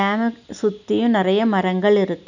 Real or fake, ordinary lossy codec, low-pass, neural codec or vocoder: real; none; 7.2 kHz; none